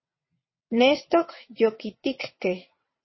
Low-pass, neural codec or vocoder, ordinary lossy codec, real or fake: 7.2 kHz; vocoder, 22.05 kHz, 80 mel bands, WaveNeXt; MP3, 24 kbps; fake